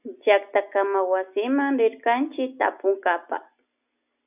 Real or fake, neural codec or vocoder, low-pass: real; none; 3.6 kHz